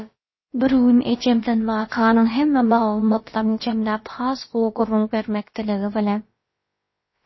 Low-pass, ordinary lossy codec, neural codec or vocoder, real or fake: 7.2 kHz; MP3, 24 kbps; codec, 16 kHz, about 1 kbps, DyCAST, with the encoder's durations; fake